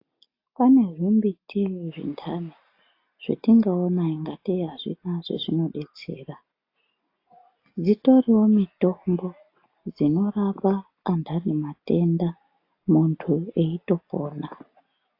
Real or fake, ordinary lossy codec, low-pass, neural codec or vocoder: real; AAC, 32 kbps; 5.4 kHz; none